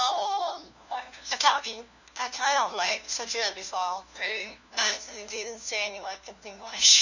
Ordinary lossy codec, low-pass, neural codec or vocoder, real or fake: none; 7.2 kHz; codec, 16 kHz, 1 kbps, FunCodec, trained on LibriTTS, 50 frames a second; fake